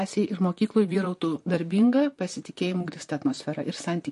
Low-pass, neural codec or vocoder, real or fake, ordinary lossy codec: 14.4 kHz; vocoder, 44.1 kHz, 128 mel bands, Pupu-Vocoder; fake; MP3, 48 kbps